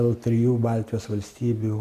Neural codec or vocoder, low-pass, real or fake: none; 14.4 kHz; real